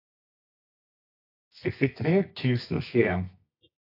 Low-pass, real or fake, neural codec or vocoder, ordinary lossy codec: 5.4 kHz; fake; codec, 24 kHz, 0.9 kbps, WavTokenizer, medium music audio release; AAC, 32 kbps